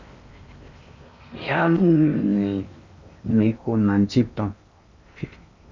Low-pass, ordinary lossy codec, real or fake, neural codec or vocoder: 7.2 kHz; MP3, 64 kbps; fake; codec, 16 kHz in and 24 kHz out, 0.6 kbps, FocalCodec, streaming, 4096 codes